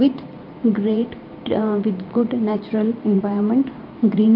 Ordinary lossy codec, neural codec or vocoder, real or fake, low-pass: Opus, 24 kbps; vocoder, 44.1 kHz, 128 mel bands every 512 samples, BigVGAN v2; fake; 5.4 kHz